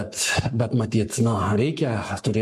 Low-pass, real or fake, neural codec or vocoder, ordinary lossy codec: 14.4 kHz; fake; codec, 44.1 kHz, 7.8 kbps, Pupu-Codec; MP3, 64 kbps